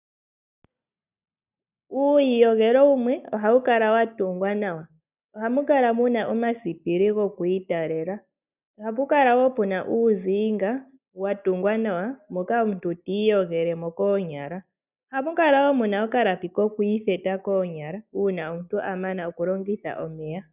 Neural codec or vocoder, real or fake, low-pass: none; real; 3.6 kHz